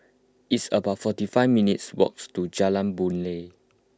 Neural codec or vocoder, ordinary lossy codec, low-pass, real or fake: none; none; none; real